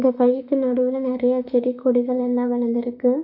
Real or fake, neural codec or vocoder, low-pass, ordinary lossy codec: fake; autoencoder, 48 kHz, 32 numbers a frame, DAC-VAE, trained on Japanese speech; 5.4 kHz; none